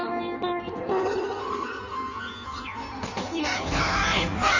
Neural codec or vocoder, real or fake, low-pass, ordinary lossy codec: codec, 16 kHz in and 24 kHz out, 1.1 kbps, FireRedTTS-2 codec; fake; 7.2 kHz; none